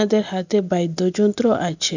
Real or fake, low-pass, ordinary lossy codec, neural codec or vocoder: real; 7.2 kHz; none; none